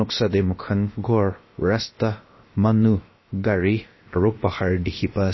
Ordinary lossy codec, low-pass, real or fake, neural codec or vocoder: MP3, 24 kbps; 7.2 kHz; fake; codec, 16 kHz, about 1 kbps, DyCAST, with the encoder's durations